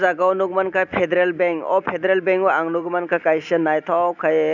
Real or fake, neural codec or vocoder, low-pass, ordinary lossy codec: real; none; 7.2 kHz; none